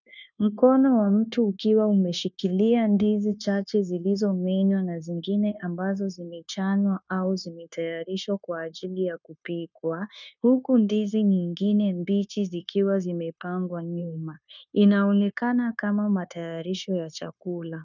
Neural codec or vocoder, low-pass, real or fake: codec, 16 kHz, 0.9 kbps, LongCat-Audio-Codec; 7.2 kHz; fake